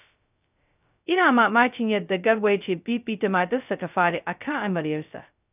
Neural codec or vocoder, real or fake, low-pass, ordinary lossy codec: codec, 16 kHz, 0.2 kbps, FocalCodec; fake; 3.6 kHz; none